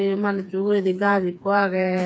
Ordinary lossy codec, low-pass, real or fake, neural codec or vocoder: none; none; fake; codec, 16 kHz, 4 kbps, FreqCodec, smaller model